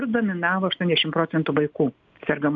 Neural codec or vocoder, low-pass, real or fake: none; 7.2 kHz; real